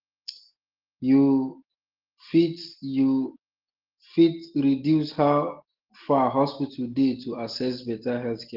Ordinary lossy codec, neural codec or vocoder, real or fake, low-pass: Opus, 16 kbps; none; real; 5.4 kHz